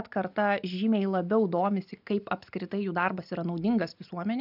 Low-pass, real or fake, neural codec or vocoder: 5.4 kHz; real; none